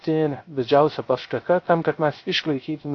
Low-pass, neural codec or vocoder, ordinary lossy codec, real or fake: 7.2 kHz; codec, 16 kHz, 0.3 kbps, FocalCodec; AAC, 32 kbps; fake